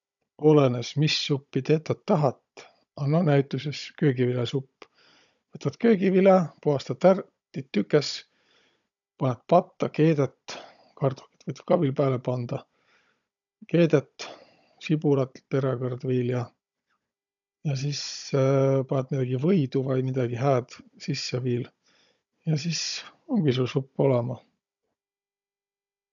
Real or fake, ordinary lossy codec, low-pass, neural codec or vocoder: fake; none; 7.2 kHz; codec, 16 kHz, 16 kbps, FunCodec, trained on Chinese and English, 50 frames a second